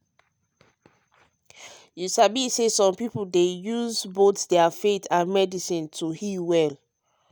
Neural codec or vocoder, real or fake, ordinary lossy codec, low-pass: none; real; none; none